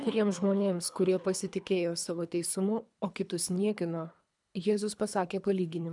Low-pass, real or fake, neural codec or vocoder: 10.8 kHz; fake; codec, 24 kHz, 3 kbps, HILCodec